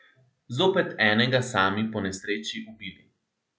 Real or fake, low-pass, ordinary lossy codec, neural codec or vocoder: real; none; none; none